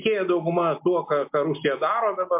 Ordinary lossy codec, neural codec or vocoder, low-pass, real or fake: MP3, 32 kbps; none; 3.6 kHz; real